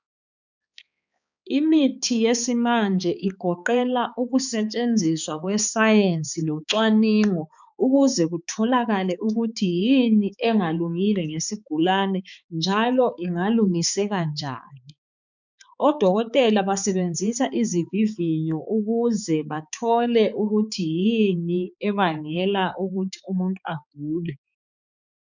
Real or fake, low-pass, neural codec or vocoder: fake; 7.2 kHz; codec, 16 kHz, 4 kbps, X-Codec, HuBERT features, trained on balanced general audio